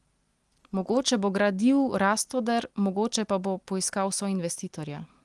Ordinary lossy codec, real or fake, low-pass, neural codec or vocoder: Opus, 24 kbps; real; 10.8 kHz; none